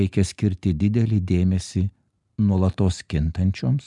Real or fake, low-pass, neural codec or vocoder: real; 10.8 kHz; none